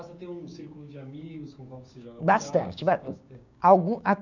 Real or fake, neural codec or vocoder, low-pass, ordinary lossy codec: fake; codec, 16 kHz, 6 kbps, DAC; 7.2 kHz; none